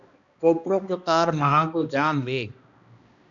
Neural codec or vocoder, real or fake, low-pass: codec, 16 kHz, 1 kbps, X-Codec, HuBERT features, trained on balanced general audio; fake; 7.2 kHz